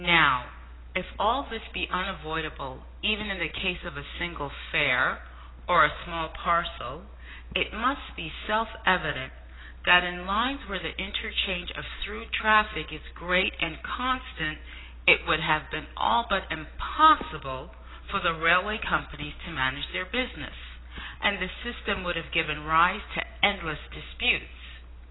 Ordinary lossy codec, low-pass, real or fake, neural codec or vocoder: AAC, 16 kbps; 7.2 kHz; real; none